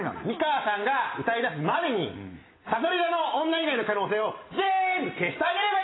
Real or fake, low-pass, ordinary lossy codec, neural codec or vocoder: real; 7.2 kHz; AAC, 16 kbps; none